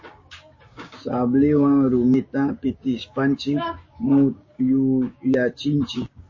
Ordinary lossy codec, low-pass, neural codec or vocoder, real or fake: MP3, 32 kbps; 7.2 kHz; codec, 16 kHz, 6 kbps, DAC; fake